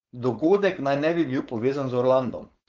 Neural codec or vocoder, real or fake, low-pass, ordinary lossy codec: codec, 16 kHz, 4.8 kbps, FACodec; fake; 7.2 kHz; Opus, 24 kbps